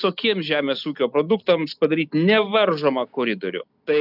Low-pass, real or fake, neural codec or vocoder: 5.4 kHz; real; none